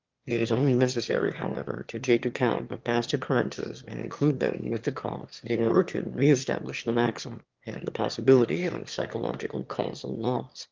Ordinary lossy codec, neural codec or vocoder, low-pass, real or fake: Opus, 32 kbps; autoencoder, 22.05 kHz, a latent of 192 numbers a frame, VITS, trained on one speaker; 7.2 kHz; fake